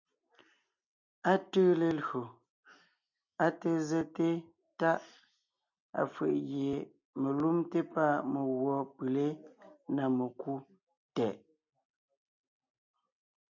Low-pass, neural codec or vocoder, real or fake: 7.2 kHz; none; real